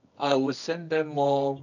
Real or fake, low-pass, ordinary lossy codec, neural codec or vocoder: fake; 7.2 kHz; none; codec, 24 kHz, 0.9 kbps, WavTokenizer, medium music audio release